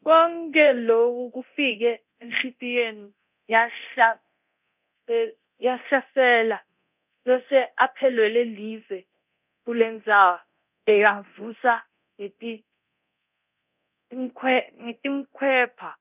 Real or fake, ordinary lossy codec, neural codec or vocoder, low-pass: fake; none; codec, 24 kHz, 0.9 kbps, DualCodec; 3.6 kHz